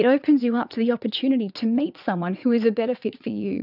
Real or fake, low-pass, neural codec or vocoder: fake; 5.4 kHz; vocoder, 22.05 kHz, 80 mel bands, WaveNeXt